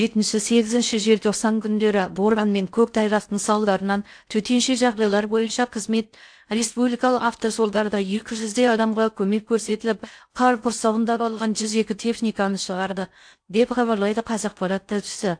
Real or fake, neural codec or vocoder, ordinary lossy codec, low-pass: fake; codec, 16 kHz in and 24 kHz out, 0.6 kbps, FocalCodec, streaming, 4096 codes; AAC, 64 kbps; 9.9 kHz